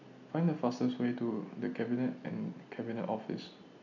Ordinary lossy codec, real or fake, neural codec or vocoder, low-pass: none; real; none; 7.2 kHz